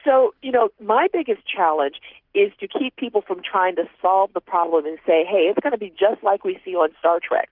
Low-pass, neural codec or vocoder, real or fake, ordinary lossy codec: 5.4 kHz; none; real; Opus, 32 kbps